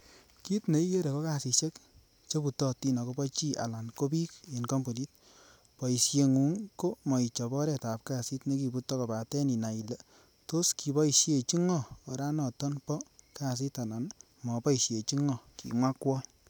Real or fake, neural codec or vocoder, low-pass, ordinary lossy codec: real; none; none; none